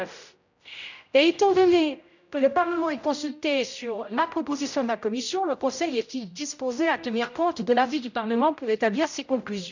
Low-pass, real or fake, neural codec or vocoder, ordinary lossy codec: 7.2 kHz; fake; codec, 16 kHz, 0.5 kbps, X-Codec, HuBERT features, trained on general audio; none